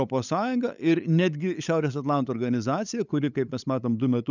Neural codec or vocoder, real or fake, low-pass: codec, 16 kHz, 8 kbps, FunCodec, trained on LibriTTS, 25 frames a second; fake; 7.2 kHz